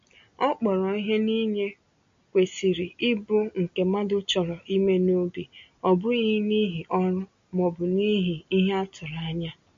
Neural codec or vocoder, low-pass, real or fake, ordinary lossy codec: none; 7.2 kHz; real; MP3, 48 kbps